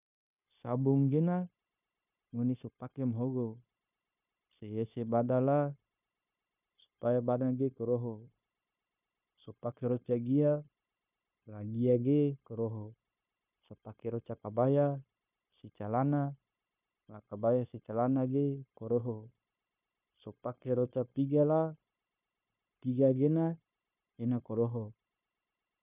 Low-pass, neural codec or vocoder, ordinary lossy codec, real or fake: 3.6 kHz; codec, 16 kHz, 0.9 kbps, LongCat-Audio-Codec; none; fake